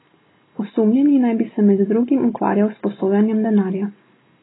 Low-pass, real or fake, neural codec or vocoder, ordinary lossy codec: 7.2 kHz; real; none; AAC, 16 kbps